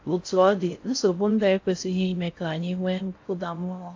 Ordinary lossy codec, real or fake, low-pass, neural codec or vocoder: AAC, 48 kbps; fake; 7.2 kHz; codec, 16 kHz in and 24 kHz out, 0.6 kbps, FocalCodec, streaming, 4096 codes